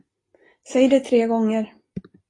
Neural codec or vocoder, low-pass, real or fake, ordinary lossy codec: none; 10.8 kHz; real; AAC, 32 kbps